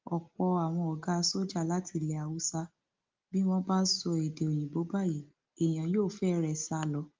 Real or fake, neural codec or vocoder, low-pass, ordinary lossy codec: real; none; 7.2 kHz; Opus, 24 kbps